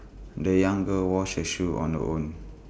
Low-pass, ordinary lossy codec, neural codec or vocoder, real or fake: none; none; none; real